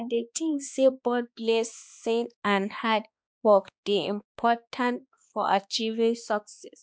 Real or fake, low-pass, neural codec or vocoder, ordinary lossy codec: fake; none; codec, 16 kHz, 2 kbps, X-Codec, HuBERT features, trained on balanced general audio; none